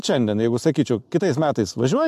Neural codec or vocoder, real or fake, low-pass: none; real; 14.4 kHz